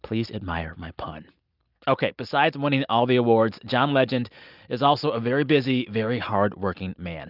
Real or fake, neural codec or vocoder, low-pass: real; none; 5.4 kHz